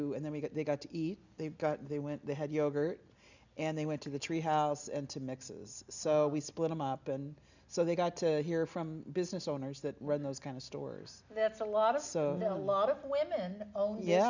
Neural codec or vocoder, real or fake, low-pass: none; real; 7.2 kHz